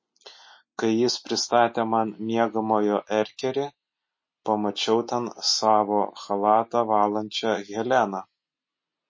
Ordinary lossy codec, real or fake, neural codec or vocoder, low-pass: MP3, 32 kbps; real; none; 7.2 kHz